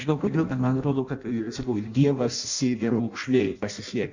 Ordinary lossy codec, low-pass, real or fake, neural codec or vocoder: Opus, 64 kbps; 7.2 kHz; fake; codec, 16 kHz in and 24 kHz out, 0.6 kbps, FireRedTTS-2 codec